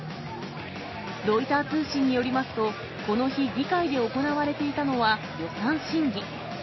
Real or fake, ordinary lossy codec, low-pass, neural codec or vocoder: real; MP3, 24 kbps; 7.2 kHz; none